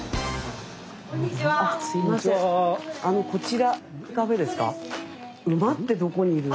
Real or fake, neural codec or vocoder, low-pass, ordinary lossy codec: real; none; none; none